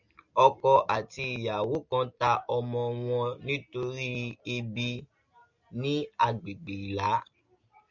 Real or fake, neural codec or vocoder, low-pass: real; none; 7.2 kHz